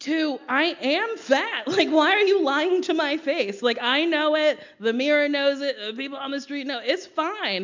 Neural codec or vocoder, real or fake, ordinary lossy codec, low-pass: none; real; MP3, 64 kbps; 7.2 kHz